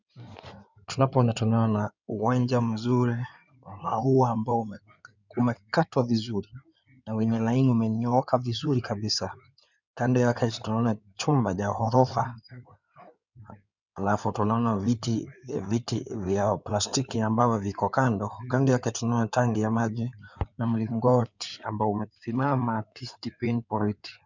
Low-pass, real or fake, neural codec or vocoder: 7.2 kHz; fake; codec, 16 kHz in and 24 kHz out, 2.2 kbps, FireRedTTS-2 codec